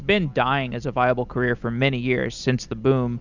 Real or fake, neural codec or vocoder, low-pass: real; none; 7.2 kHz